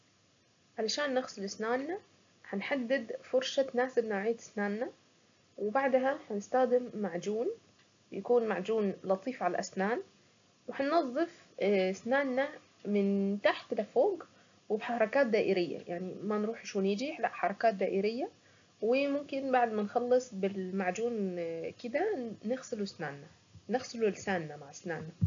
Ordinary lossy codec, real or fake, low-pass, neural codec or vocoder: AAC, 64 kbps; real; 7.2 kHz; none